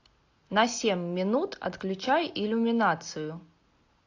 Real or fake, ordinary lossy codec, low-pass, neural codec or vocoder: real; AAC, 48 kbps; 7.2 kHz; none